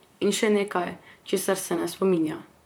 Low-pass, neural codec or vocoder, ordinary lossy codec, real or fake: none; vocoder, 44.1 kHz, 128 mel bands, Pupu-Vocoder; none; fake